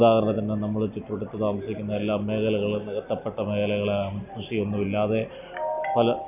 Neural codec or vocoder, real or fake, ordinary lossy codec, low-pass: none; real; none; 3.6 kHz